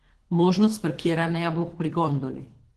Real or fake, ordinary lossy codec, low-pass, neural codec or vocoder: fake; Opus, 16 kbps; 10.8 kHz; codec, 24 kHz, 3 kbps, HILCodec